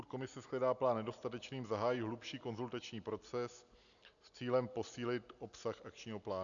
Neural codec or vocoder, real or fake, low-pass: none; real; 7.2 kHz